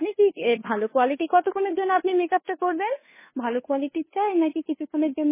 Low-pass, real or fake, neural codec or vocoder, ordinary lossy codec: 3.6 kHz; fake; codec, 16 kHz, 2 kbps, FunCodec, trained on Chinese and English, 25 frames a second; MP3, 16 kbps